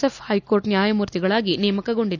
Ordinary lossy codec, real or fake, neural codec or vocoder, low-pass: none; real; none; 7.2 kHz